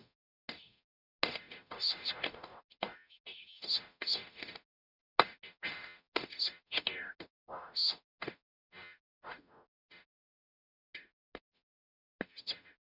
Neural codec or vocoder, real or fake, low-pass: codec, 44.1 kHz, 0.9 kbps, DAC; fake; 5.4 kHz